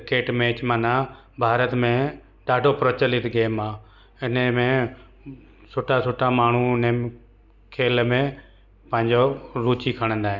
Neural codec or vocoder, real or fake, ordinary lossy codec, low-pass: none; real; none; 7.2 kHz